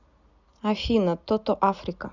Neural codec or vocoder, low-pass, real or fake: none; 7.2 kHz; real